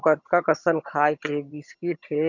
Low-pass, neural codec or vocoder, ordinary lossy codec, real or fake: 7.2 kHz; vocoder, 22.05 kHz, 80 mel bands, HiFi-GAN; none; fake